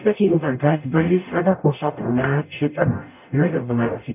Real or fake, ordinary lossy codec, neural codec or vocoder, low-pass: fake; none; codec, 44.1 kHz, 0.9 kbps, DAC; 3.6 kHz